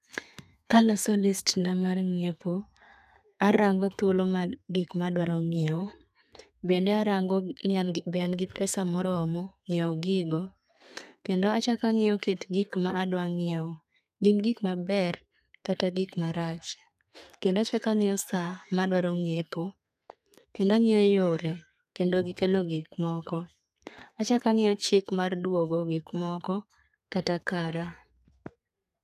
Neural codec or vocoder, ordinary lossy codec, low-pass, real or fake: codec, 32 kHz, 1.9 kbps, SNAC; none; 14.4 kHz; fake